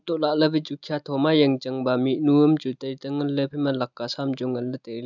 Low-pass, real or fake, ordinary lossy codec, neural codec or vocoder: 7.2 kHz; real; none; none